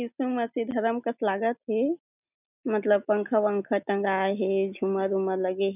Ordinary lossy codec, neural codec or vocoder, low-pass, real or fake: none; none; 3.6 kHz; real